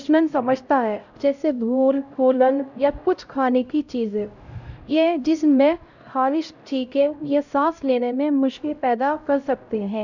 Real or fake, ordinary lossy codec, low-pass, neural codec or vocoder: fake; none; 7.2 kHz; codec, 16 kHz, 0.5 kbps, X-Codec, HuBERT features, trained on LibriSpeech